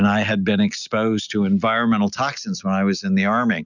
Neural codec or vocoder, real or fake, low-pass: none; real; 7.2 kHz